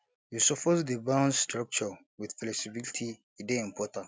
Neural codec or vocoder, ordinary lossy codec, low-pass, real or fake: none; none; none; real